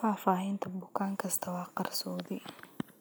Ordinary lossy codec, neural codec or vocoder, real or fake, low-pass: none; none; real; none